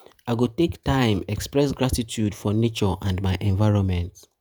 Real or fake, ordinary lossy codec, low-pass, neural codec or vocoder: fake; none; none; vocoder, 48 kHz, 128 mel bands, Vocos